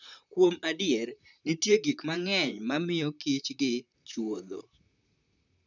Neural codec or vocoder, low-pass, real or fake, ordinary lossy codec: vocoder, 44.1 kHz, 128 mel bands, Pupu-Vocoder; 7.2 kHz; fake; none